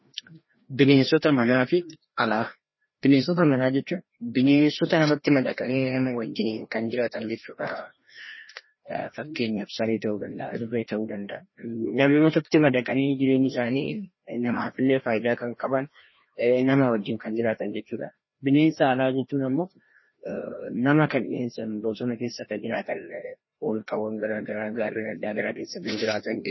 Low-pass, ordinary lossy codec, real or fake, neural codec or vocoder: 7.2 kHz; MP3, 24 kbps; fake; codec, 16 kHz, 1 kbps, FreqCodec, larger model